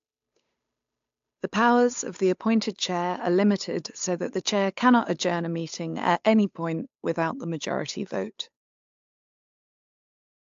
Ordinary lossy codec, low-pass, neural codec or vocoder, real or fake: AAC, 64 kbps; 7.2 kHz; codec, 16 kHz, 8 kbps, FunCodec, trained on Chinese and English, 25 frames a second; fake